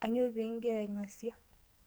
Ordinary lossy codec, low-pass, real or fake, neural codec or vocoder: none; none; fake; codec, 44.1 kHz, 2.6 kbps, SNAC